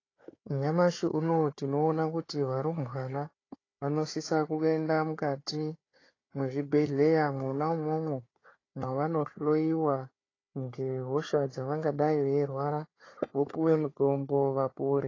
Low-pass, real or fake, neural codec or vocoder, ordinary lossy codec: 7.2 kHz; fake; codec, 16 kHz, 4 kbps, FunCodec, trained on Chinese and English, 50 frames a second; AAC, 32 kbps